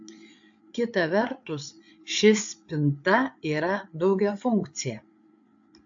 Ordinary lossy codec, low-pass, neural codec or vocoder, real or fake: AAC, 64 kbps; 7.2 kHz; codec, 16 kHz, 8 kbps, FreqCodec, larger model; fake